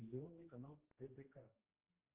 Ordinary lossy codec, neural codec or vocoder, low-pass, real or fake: Opus, 16 kbps; codec, 16 kHz, 1 kbps, X-Codec, HuBERT features, trained on general audio; 3.6 kHz; fake